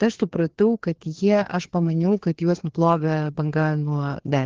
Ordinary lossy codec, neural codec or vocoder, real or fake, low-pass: Opus, 16 kbps; codec, 16 kHz, 2 kbps, FreqCodec, larger model; fake; 7.2 kHz